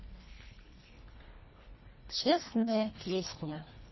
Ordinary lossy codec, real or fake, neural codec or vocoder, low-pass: MP3, 24 kbps; fake; codec, 24 kHz, 1.5 kbps, HILCodec; 7.2 kHz